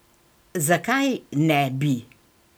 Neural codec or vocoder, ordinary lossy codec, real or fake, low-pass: none; none; real; none